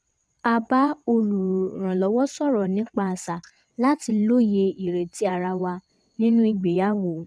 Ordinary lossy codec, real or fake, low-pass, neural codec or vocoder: none; fake; none; vocoder, 22.05 kHz, 80 mel bands, WaveNeXt